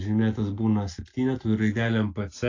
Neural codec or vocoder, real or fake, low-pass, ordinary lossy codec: none; real; 7.2 kHz; AAC, 48 kbps